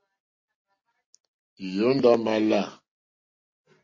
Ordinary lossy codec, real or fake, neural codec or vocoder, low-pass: MP3, 32 kbps; real; none; 7.2 kHz